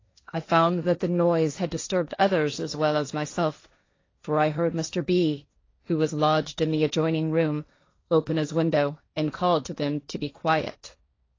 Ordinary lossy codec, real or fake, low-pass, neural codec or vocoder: AAC, 32 kbps; fake; 7.2 kHz; codec, 16 kHz, 1.1 kbps, Voila-Tokenizer